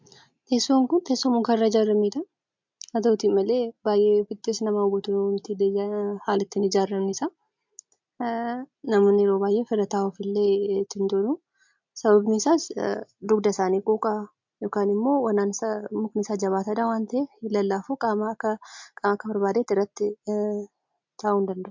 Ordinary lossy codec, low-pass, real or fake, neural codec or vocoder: MP3, 64 kbps; 7.2 kHz; real; none